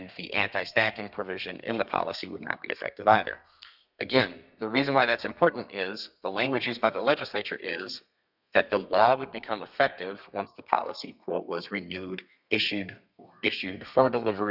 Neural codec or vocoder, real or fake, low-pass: codec, 32 kHz, 1.9 kbps, SNAC; fake; 5.4 kHz